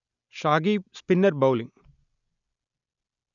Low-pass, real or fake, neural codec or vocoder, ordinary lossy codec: 7.2 kHz; real; none; none